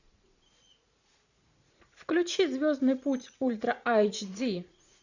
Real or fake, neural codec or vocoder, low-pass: fake; vocoder, 44.1 kHz, 80 mel bands, Vocos; 7.2 kHz